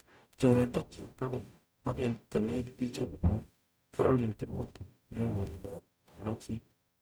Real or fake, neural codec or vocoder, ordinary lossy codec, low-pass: fake; codec, 44.1 kHz, 0.9 kbps, DAC; none; none